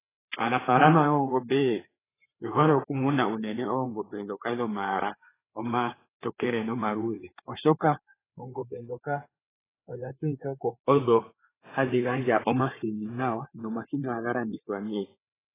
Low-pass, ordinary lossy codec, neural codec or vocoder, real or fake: 3.6 kHz; AAC, 16 kbps; codec, 16 kHz, 4 kbps, FreqCodec, larger model; fake